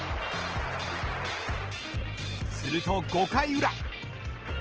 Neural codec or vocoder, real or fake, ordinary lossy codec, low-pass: none; real; Opus, 16 kbps; 7.2 kHz